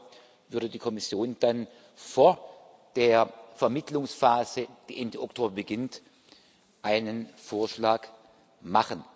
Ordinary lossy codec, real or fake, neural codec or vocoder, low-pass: none; real; none; none